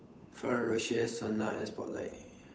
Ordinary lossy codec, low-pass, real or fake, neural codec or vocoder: none; none; fake; codec, 16 kHz, 8 kbps, FunCodec, trained on Chinese and English, 25 frames a second